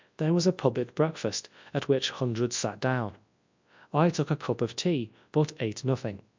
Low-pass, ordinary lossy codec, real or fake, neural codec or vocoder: 7.2 kHz; MP3, 64 kbps; fake; codec, 24 kHz, 0.9 kbps, WavTokenizer, large speech release